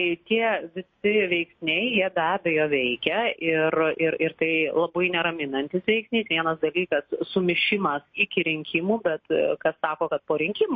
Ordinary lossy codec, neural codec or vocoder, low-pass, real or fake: MP3, 32 kbps; none; 7.2 kHz; real